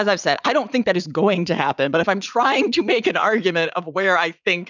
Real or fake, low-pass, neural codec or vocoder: fake; 7.2 kHz; vocoder, 44.1 kHz, 80 mel bands, Vocos